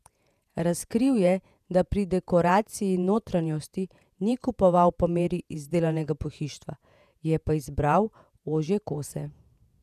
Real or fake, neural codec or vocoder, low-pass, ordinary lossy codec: fake; vocoder, 48 kHz, 128 mel bands, Vocos; 14.4 kHz; none